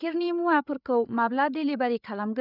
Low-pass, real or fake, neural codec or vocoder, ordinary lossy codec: 5.4 kHz; fake; codec, 16 kHz, 8 kbps, FreqCodec, larger model; none